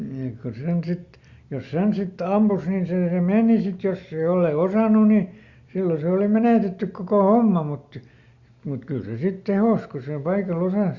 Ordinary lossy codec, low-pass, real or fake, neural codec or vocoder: Opus, 64 kbps; 7.2 kHz; real; none